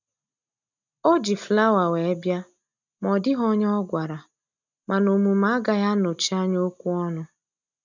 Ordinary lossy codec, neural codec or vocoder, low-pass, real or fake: none; none; 7.2 kHz; real